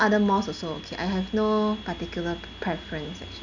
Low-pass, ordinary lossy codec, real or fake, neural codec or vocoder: 7.2 kHz; none; real; none